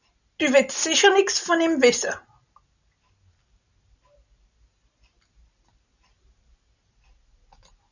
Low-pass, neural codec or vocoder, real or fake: 7.2 kHz; none; real